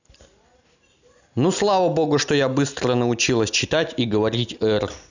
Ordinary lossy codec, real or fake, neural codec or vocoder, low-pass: none; real; none; 7.2 kHz